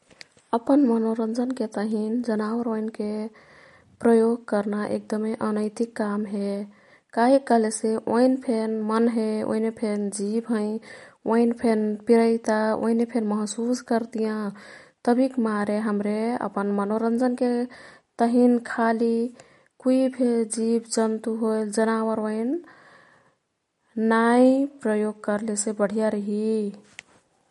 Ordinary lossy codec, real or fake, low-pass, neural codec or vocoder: MP3, 48 kbps; real; 19.8 kHz; none